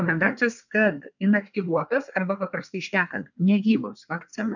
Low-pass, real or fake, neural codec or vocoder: 7.2 kHz; fake; codec, 24 kHz, 1 kbps, SNAC